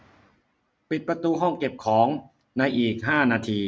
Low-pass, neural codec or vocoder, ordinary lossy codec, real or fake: none; none; none; real